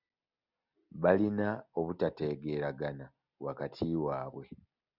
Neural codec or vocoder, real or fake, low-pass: none; real; 5.4 kHz